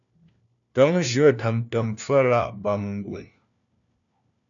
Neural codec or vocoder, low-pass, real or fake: codec, 16 kHz, 1 kbps, FunCodec, trained on LibriTTS, 50 frames a second; 7.2 kHz; fake